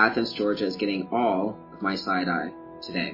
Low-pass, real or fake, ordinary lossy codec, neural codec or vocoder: 5.4 kHz; real; MP3, 24 kbps; none